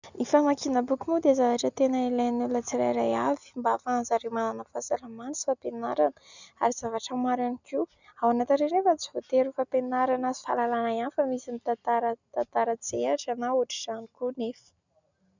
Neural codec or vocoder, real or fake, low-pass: none; real; 7.2 kHz